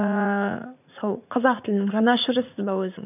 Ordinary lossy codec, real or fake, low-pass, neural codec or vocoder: none; fake; 3.6 kHz; vocoder, 44.1 kHz, 80 mel bands, Vocos